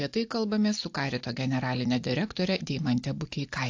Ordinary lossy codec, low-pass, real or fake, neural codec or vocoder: AAC, 48 kbps; 7.2 kHz; real; none